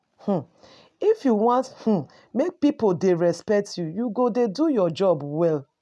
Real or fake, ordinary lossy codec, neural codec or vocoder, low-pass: real; none; none; none